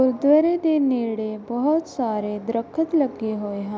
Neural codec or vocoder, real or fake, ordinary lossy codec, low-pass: none; real; none; none